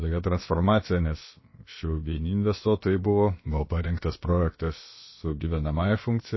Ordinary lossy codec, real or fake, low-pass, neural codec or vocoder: MP3, 24 kbps; fake; 7.2 kHz; codec, 16 kHz, about 1 kbps, DyCAST, with the encoder's durations